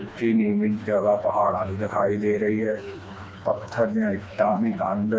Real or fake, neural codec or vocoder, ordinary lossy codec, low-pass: fake; codec, 16 kHz, 2 kbps, FreqCodec, smaller model; none; none